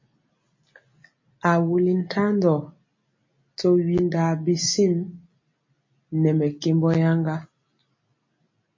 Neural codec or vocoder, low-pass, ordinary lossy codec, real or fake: none; 7.2 kHz; MP3, 32 kbps; real